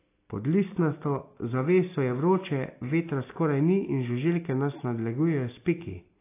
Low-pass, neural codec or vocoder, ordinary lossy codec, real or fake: 3.6 kHz; none; AAC, 24 kbps; real